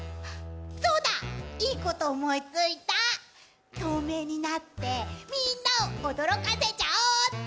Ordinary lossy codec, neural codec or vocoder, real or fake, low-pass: none; none; real; none